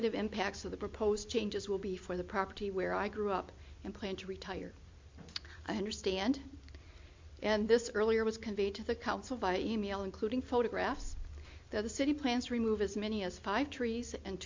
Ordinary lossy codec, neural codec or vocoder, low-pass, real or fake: MP3, 48 kbps; none; 7.2 kHz; real